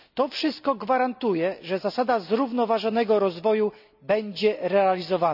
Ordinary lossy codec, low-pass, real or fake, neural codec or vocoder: none; 5.4 kHz; real; none